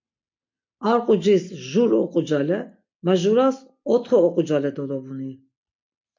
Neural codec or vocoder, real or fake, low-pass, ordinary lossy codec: none; real; 7.2 kHz; MP3, 48 kbps